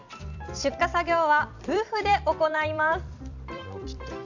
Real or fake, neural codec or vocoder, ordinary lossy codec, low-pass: fake; vocoder, 44.1 kHz, 128 mel bands every 256 samples, BigVGAN v2; none; 7.2 kHz